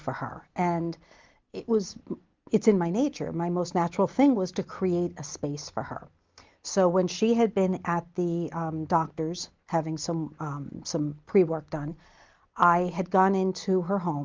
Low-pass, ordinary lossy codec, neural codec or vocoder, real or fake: 7.2 kHz; Opus, 24 kbps; none; real